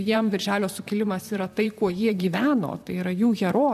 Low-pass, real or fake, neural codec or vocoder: 14.4 kHz; fake; vocoder, 44.1 kHz, 128 mel bands, Pupu-Vocoder